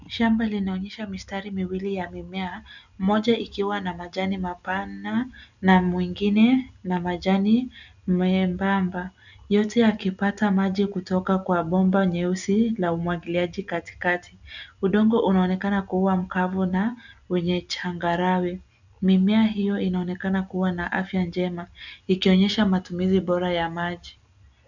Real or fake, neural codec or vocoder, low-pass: real; none; 7.2 kHz